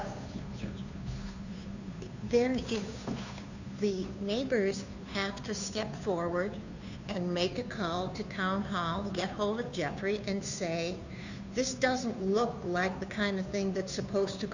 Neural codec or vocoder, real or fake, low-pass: codec, 16 kHz, 2 kbps, FunCodec, trained on Chinese and English, 25 frames a second; fake; 7.2 kHz